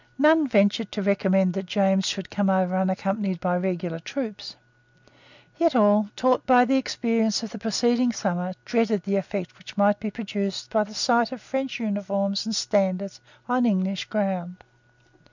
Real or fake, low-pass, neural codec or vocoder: real; 7.2 kHz; none